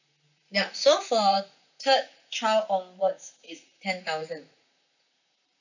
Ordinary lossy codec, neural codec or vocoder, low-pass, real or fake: none; vocoder, 44.1 kHz, 128 mel bands, Pupu-Vocoder; 7.2 kHz; fake